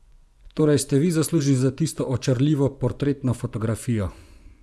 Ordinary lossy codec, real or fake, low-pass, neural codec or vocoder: none; fake; none; vocoder, 24 kHz, 100 mel bands, Vocos